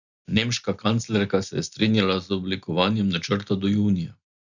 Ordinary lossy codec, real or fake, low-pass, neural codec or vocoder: none; real; 7.2 kHz; none